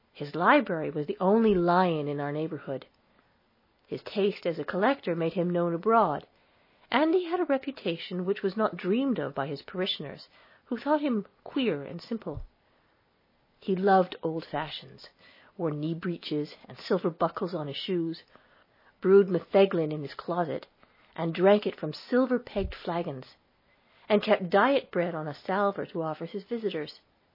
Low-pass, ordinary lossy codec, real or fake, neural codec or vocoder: 5.4 kHz; MP3, 24 kbps; real; none